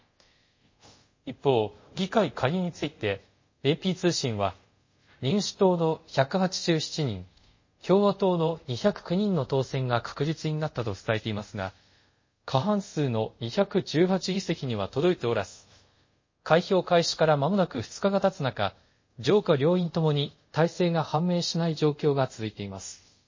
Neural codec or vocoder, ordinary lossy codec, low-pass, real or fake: codec, 24 kHz, 0.5 kbps, DualCodec; MP3, 32 kbps; 7.2 kHz; fake